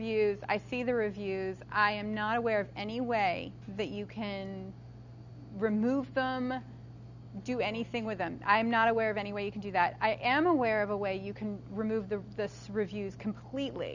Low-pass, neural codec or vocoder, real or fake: 7.2 kHz; none; real